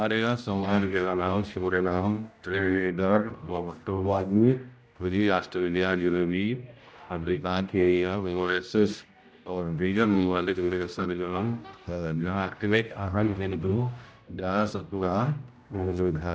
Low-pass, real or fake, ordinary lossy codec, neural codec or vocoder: none; fake; none; codec, 16 kHz, 0.5 kbps, X-Codec, HuBERT features, trained on general audio